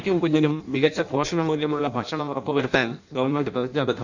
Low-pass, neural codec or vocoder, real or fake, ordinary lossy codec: 7.2 kHz; codec, 16 kHz in and 24 kHz out, 0.6 kbps, FireRedTTS-2 codec; fake; none